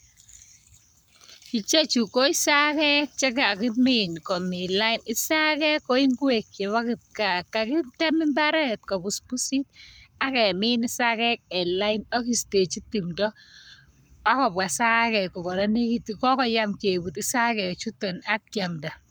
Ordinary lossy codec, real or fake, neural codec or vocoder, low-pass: none; fake; codec, 44.1 kHz, 7.8 kbps, Pupu-Codec; none